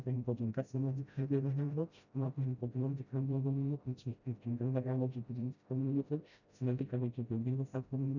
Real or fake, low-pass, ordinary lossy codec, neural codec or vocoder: fake; 7.2 kHz; none; codec, 16 kHz, 0.5 kbps, FreqCodec, smaller model